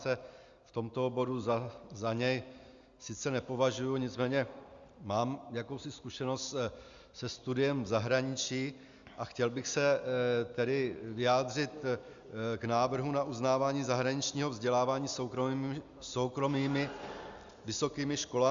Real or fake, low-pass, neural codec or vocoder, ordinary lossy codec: real; 7.2 kHz; none; Opus, 64 kbps